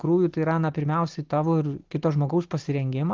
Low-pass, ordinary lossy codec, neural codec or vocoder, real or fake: 7.2 kHz; Opus, 16 kbps; none; real